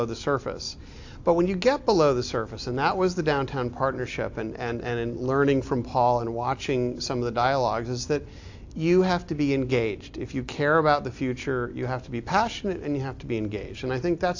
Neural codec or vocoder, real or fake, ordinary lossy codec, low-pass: none; real; AAC, 48 kbps; 7.2 kHz